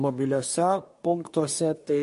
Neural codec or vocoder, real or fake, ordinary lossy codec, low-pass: codec, 24 kHz, 1 kbps, SNAC; fake; MP3, 48 kbps; 10.8 kHz